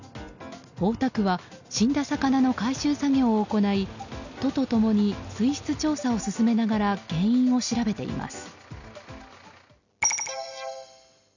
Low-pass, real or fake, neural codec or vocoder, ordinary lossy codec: 7.2 kHz; real; none; none